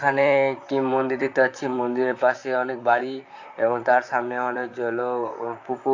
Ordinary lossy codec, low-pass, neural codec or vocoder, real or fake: AAC, 48 kbps; 7.2 kHz; vocoder, 44.1 kHz, 128 mel bands, Pupu-Vocoder; fake